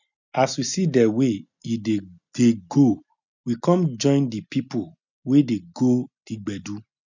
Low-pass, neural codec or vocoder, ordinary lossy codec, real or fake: 7.2 kHz; none; none; real